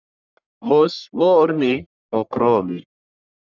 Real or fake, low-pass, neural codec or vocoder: fake; 7.2 kHz; codec, 44.1 kHz, 1.7 kbps, Pupu-Codec